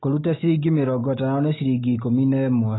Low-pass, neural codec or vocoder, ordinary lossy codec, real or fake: 7.2 kHz; none; AAC, 16 kbps; real